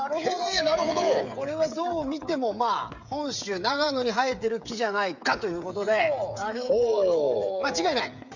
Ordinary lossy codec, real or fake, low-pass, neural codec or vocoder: none; fake; 7.2 kHz; codec, 16 kHz, 8 kbps, FreqCodec, smaller model